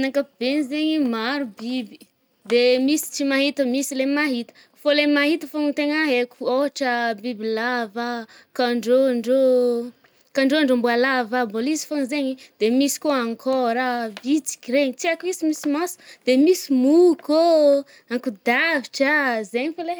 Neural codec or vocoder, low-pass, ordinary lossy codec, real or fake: none; none; none; real